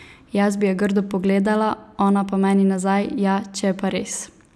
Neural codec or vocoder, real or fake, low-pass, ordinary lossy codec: none; real; none; none